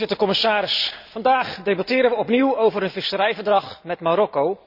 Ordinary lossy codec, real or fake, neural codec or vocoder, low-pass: none; real; none; 5.4 kHz